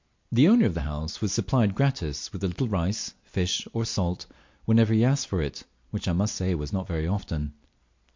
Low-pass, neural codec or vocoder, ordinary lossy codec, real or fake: 7.2 kHz; none; MP3, 48 kbps; real